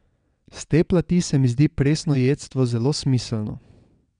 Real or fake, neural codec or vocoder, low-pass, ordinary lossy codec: fake; vocoder, 22.05 kHz, 80 mel bands, WaveNeXt; 9.9 kHz; none